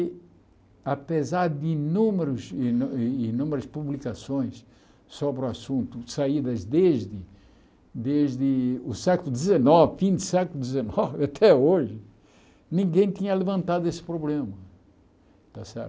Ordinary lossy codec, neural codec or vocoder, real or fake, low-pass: none; none; real; none